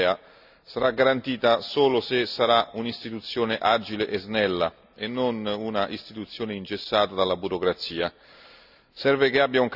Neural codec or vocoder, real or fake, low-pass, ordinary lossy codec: none; real; 5.4 kHz; none